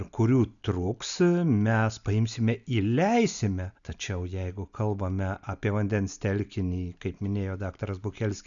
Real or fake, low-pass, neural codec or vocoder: real; 7.2 kHz; none